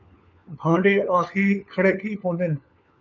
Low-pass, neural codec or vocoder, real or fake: 7.2 kHz; codec, 16 kHz, 4 kbps, FunCodec, trained on LibriTTS, 50 frames a second; fake